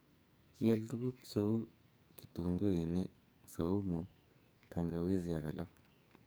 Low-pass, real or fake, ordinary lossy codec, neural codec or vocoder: none; fake; none; codec, 44.1 kHz, 2.6 kbps, SNAC